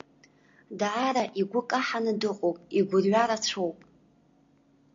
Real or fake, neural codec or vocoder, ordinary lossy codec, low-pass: real; none; AAC, 48 kbps; 7.2 kHz